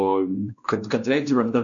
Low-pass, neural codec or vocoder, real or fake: 7.2 kHz; codec, 16 kHz, 1 kbps, X-Codec, WavLM features, trained on Multilingual LibriSpeech; fake